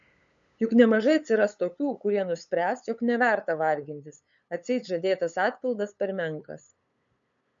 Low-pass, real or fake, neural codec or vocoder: 7.2 kHz; fake; codec, 16 kHz, 8 kbps, FunCodec, trained on LibriTTS, 25 frames a second